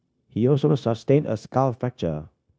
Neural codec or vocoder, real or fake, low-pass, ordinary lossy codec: codec, 16 kHz, 0.9 kbps, LongCat-Audio-Codec; fake; none; none